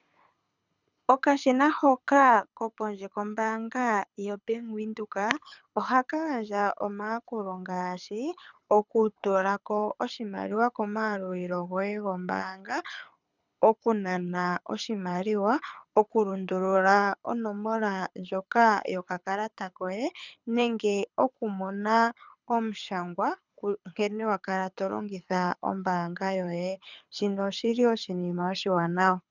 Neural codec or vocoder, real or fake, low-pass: codec, 24 kHz, 6 kbps, HILCodec; fake; 7.2 kHz